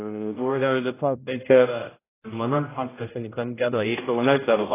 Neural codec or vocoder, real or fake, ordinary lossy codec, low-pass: codec, 16 kHz, 0.5 kbps, X-Codec, HuBERT features, trained on general audio; fake; AAC, 16 kbps; 3.6 kHz